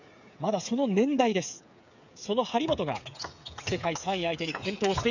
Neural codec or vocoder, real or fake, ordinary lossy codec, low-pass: codec, 16 kHz, 8 kbps, FreqCodec, smaller model; fake; none; 7.2 kHz